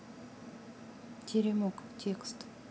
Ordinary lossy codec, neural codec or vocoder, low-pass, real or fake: none; none; none; real